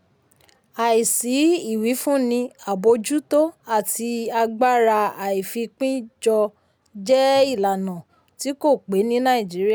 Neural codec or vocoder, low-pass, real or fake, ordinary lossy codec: none; none; real; none